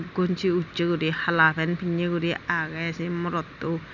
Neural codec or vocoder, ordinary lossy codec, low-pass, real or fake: none; none; 7.2 kHz; real